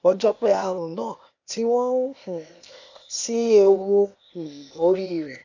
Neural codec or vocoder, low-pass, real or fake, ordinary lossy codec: codec, 16 kHz, 0.8 kbps, ZipCodec; 7.2 kHz; fake; none